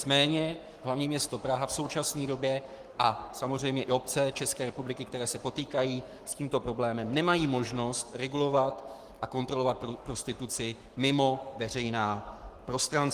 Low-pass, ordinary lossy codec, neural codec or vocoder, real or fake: 14.4 kHz; Opus, 24 kbps; codec, 44.1 kHz, 7.8 kbps, Pupu-Codec; fake